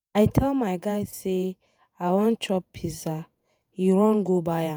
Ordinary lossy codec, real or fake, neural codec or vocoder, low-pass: none; fake; vocoder, 48 kHz, 128 mel bands, Vocos; none